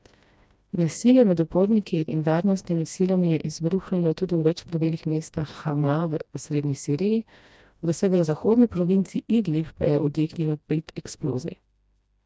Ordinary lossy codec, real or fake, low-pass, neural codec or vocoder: none; fake; none; codec, 16 kHz, 1 kbps, FreqCodec, smaller model